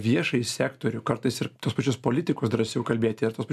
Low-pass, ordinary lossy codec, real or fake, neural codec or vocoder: 14.4 kHz; AAC, 96 kbps; real; none